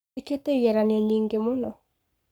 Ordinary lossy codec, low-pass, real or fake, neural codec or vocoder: none; none; fake; codec, 44.1 kHz, 7.8 kbps, Pupu-Codec